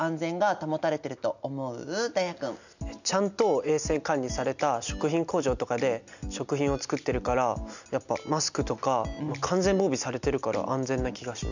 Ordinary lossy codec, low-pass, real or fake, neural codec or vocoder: none; 7.2 kHz; real; none